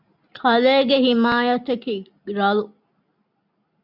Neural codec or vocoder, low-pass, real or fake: none; 5.4 kHz; real